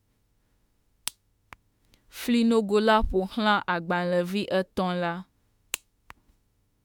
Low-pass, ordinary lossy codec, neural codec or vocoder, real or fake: 19.8 kHz; MP3, 96 kbps; autoencoder, 48 kHz, 32 numbers a frame, DAC-VAE, trained on Japanese speech; fake